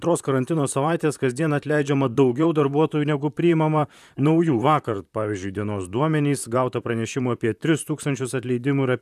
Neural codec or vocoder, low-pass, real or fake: vocoder, 44.1 kHz, 128 mel bands, Pupu-Vocoder; 14.4 kHz; fake